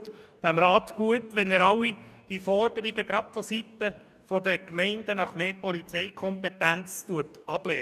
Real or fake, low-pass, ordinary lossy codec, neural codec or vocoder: fake; 14.4 kHz; none; codec, 44.1 kHz, 2.6 kbps, DAC